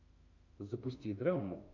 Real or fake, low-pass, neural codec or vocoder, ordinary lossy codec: fake; 7.2 kHz; autoencoder, 48 kHz, 32 numbers a frame, DAC-VAE, trained on Japanese speech; MP3, 64 kbps